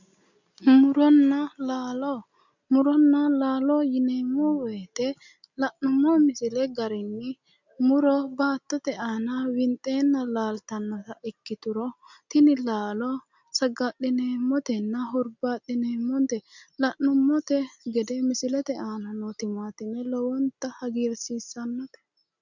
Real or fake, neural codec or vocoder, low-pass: real; none; 7.2 kHz